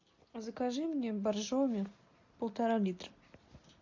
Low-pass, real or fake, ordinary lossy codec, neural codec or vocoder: 7.2 kHz; real; MP3, 48 kbps; none